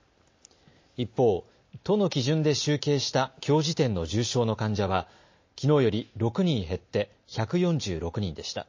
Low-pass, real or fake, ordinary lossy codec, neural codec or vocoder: 7.2 kHz; real; MP3, 32 kbps; none